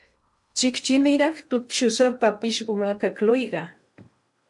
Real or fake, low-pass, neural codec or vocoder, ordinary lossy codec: fake; 10.8 kHz; codec, 16 kHz in and 24 kHz out, 0.8 kbps, FocalCodec, streaming, 65536 codes; MP3, 64 kbps